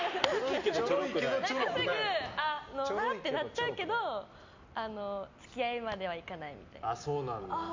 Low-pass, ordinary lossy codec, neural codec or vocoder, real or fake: 7.2 kHz; MP3, 64 kbps; none; real